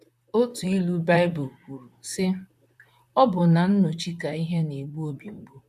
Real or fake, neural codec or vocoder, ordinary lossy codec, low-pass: fake; vocoder, 44.1 kHz, 128 mel bands, Pupu-Vocoder; none; 14.4 kHz